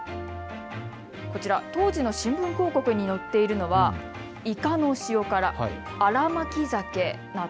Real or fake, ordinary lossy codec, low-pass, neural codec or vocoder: real; none; none; none